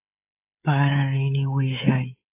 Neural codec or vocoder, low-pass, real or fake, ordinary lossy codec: codec, 16 kHz, 16 kbps, FreqCodec, smaller model; 3.6 kHz; fake; AAC, 32 kbps